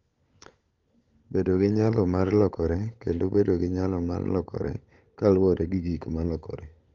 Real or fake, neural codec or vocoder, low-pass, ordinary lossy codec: fake; codec, 16 kHz, 16 kbps, FunCodec, trained on LibriTTS, 50 frames a second; 7.2 kHz; Opus, 32 kbps